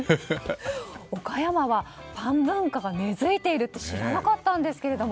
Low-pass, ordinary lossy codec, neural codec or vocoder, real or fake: none; none; none; real